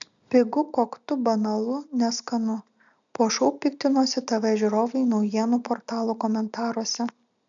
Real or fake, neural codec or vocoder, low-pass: real; none; 7.2 kHz